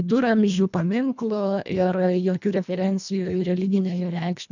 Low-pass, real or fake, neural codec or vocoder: 7.2 kHz; fake; codec, 24 kHz, 1.5 kbps, HILCodec